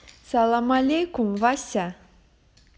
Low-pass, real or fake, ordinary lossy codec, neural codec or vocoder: none; real; none; none